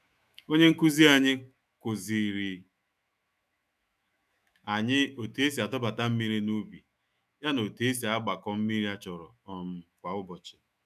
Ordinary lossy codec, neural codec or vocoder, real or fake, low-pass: none; autoencoder, 48 kHz, 128 numbers a frame, DAC-VAE, trained on Japanese speech; fake; 14.4 kHz